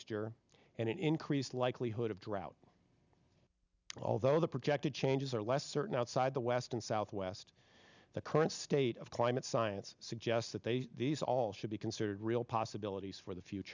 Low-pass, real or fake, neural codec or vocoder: 7.2 kHz; real; none